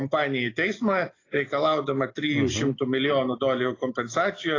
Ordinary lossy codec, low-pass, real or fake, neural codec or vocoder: AAC, 32 kbps; 7.2 kHz; real; none